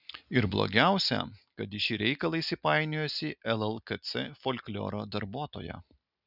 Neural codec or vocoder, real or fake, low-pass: none; real; 5.4 kHz